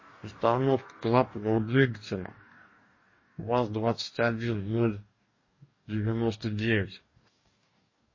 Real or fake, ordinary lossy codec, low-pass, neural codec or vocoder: fake; MP3, 32 kbps; 7.2 kHz; codec, 44.1 kHz, 2.6 kbps, DAC